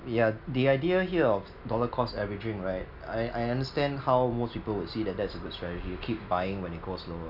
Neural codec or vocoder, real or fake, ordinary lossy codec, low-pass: none; real; none; 5.4 kHz